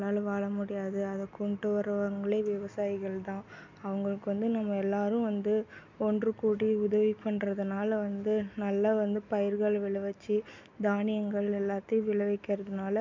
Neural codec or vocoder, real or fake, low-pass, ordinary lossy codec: none; real; 7.2 kHz; MP3, 64 kbps